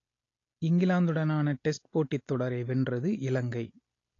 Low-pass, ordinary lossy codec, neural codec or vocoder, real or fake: 7.2 kHz; AAC, 32 kbps; none; real